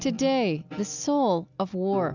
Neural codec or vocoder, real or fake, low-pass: none; real; 7.2 kHz